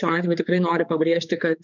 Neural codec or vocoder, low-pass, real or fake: codec, 16 kHz, 4 kbps, X-Codec, HuBERT features, trained on general audio; 7.2 kHz; fake